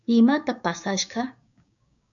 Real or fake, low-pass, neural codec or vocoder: fake; 7.2 kHz; codec, 16 kHz, 6 kbps, DAC